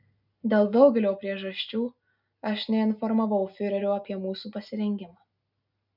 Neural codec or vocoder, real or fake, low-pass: none; real; 5.4 kHz